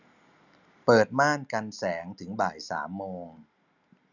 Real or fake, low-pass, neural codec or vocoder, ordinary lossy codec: real; 7.2 kHz; none; none